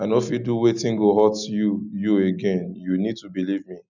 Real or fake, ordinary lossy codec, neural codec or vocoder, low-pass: real; none; none; 7.2 kHz